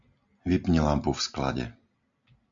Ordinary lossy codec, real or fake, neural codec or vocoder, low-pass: MP3, 64 kbps; real; none; 7.2 kHz